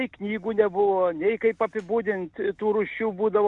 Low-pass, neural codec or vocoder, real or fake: 10.8 kHz; none; real